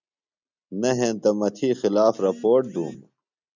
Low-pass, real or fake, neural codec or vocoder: 7.2 kHz; real; none